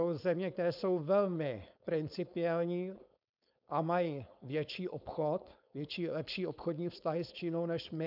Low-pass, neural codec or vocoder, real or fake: 5.4 kHz; codec, 16 kHz, 4.8 kbps, FACodec; fake